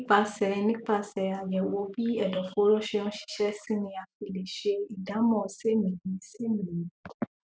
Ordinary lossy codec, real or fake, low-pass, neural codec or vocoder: none; real; none; none